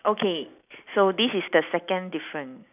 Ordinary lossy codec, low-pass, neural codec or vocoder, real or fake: none; 3.6 kHz; none; real